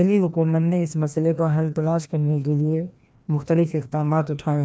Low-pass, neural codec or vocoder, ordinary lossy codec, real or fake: none; codec, 16 kHz, 1 kbps, FreqCodec, larger model; none; fake